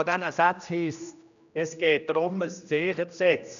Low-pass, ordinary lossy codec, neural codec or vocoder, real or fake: 7.2 kHz; none; codec, 16 kHz, 1 kbps, X-Codec, HuBERT features, trained on general audio; fake